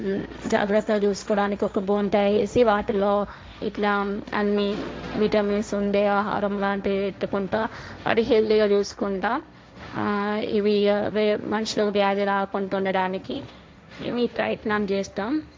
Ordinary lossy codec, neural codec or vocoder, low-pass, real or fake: none; codec, 16 kHz, 1.1 kbps, Voila-Tokenizer; none; fake